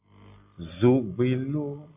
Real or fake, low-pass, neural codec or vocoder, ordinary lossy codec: real; 3.6 kHz; none; AAC, 24 kbps